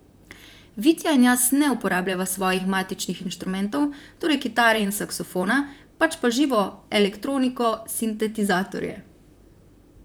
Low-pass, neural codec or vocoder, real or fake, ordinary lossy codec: none; vocoder, 44.1 kHz, 128 mel bands, Pupu-Vocoder; fake; none